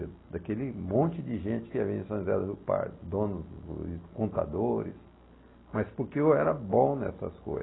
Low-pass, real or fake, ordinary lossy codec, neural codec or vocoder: 7.2 kHz; real; AAC, 16 kbps; none